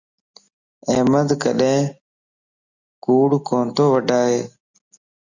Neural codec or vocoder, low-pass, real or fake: none; 7.2 kHz; real